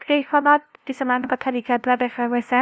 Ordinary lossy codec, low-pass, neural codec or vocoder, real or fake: none; none; codec, 16 kHz, 0.5 kbps, FunCodec, trained on LibriTTS, 25 frames a second; fake